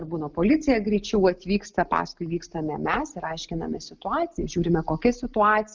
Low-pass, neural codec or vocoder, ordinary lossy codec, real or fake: 7.2 kHz; none; Opus, 24 kbps; real